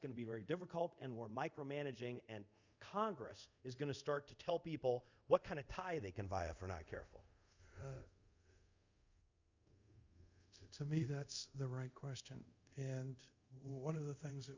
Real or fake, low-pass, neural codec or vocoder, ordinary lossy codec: fake; 7.2 kHz; codec, 24 kHz, 0.5 kbps, DualCodec; Opus, 64 kbps